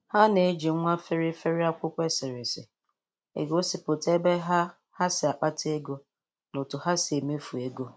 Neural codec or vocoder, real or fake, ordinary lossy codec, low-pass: none; real; none; none